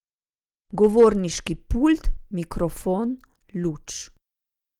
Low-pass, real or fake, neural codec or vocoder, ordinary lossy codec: 19.8 kHz; real; none; Opus, 24 kbps